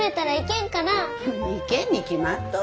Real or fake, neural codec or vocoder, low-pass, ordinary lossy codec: real; none; none; none